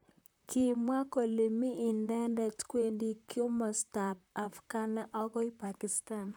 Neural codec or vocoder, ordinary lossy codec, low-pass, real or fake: vocoder, 44.1 kHz, 128 mel bands, Pupu-Vocoder; none; none; fake